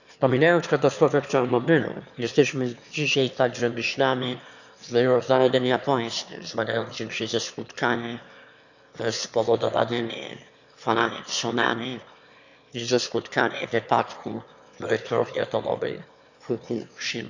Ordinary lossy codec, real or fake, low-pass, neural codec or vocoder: none; fake; 7.2 kHz; autoencoder, 22.05 kHz, a latent of 192 numbers a frame, VITS, trained on one speaker